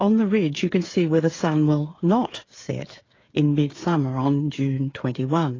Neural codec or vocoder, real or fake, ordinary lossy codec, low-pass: codec, 16 kHz, 8 kbps, FreqCodec, smaller model; fake; AAC, 32 kbps; 7.2 kHz